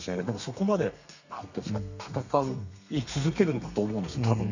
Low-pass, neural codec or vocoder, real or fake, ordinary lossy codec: 7.2 kHz; codec, 32 kHz, 1.9 kbps, SNAC; fake; none